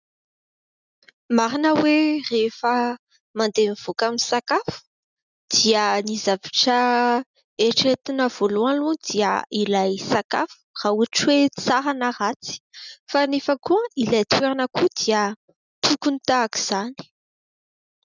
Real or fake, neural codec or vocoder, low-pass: real; none; 7.2 kHz